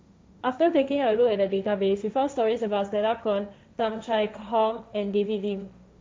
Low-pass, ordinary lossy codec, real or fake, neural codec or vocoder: none; none; fake; codec, 16 kHz, 1.1 kbps, Voila-Tokenizer